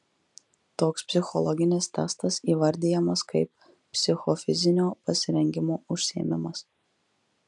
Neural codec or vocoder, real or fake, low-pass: none; real; 10.8 kHz